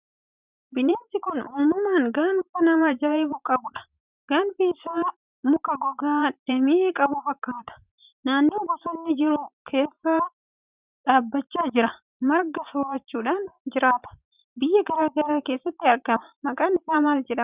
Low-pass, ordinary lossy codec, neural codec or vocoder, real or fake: 3.6 kHz; Opus, 64 kbps; vocoder, 44.1 kHz, 128 mel bands every 512 samples, BigVGAN v2; fake